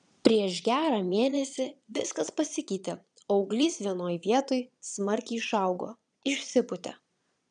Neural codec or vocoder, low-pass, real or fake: vocoder, 22.05 kHz, 80 mel bands, Vocos; 9.9 kHz; fake